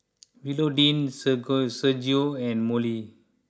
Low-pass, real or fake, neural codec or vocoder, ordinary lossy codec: none; real; none; none